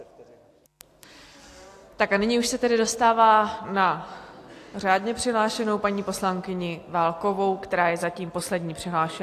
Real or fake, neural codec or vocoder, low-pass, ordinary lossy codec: real; none; 14.4 kHz; AAC, 64 kbps